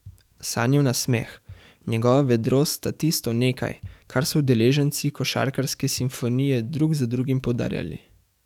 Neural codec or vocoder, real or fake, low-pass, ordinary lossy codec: codec, 44.1 kHz, 7.8 kbps, DAC; fake; 19.8 kHz; none